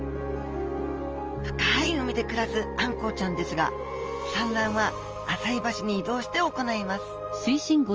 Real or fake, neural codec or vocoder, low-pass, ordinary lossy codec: real; none; 7.2 kHz; Opus, 24 kbps